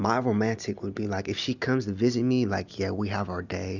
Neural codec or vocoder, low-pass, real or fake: none; 7.2 kHz; real